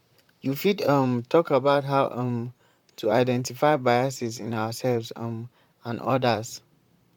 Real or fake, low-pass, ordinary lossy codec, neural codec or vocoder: fake; 19.8 kHz; MP3, 96 kbps; vocoder, 44.1 kHz, 128 mel bands, Pupu-Vocoder